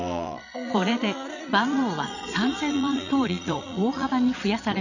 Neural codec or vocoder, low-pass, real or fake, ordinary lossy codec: vocoder, 22.05 kHz, 80 mel bands, Vocos; 7.2 kHz; fake; none